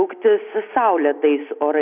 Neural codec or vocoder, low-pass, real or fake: none; 3.6 kHz; real